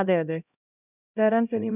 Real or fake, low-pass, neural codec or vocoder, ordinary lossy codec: fake; 3.6 kHz; codec, 16 kHz, 0.5 kbps, X-Codec, HuBERT features, trained on LibriSpeech; none